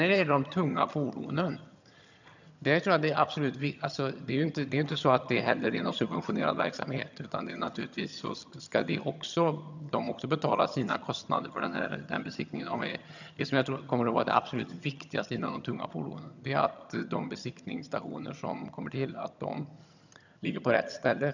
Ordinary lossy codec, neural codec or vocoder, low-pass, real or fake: none; vocoder, 22.05 kHz, 80 mel bands, HiFi-GAN; 7.2 kHz; fake